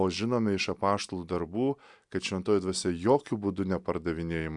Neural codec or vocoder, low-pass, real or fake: none; 10.8 kHz; real